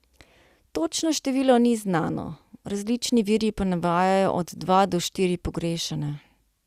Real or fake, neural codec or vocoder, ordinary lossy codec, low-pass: real; none; Opus, 64 kbps; 14.4 kHz